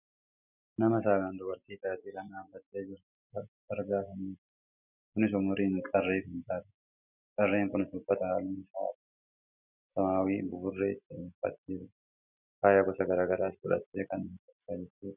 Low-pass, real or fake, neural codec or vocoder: 3.6 kHz; real; none